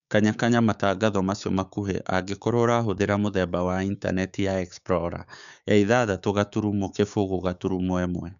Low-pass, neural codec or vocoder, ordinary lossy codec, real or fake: 7.2 kHz; codec, 16 kHz, 6 kbps, DAC; none; fake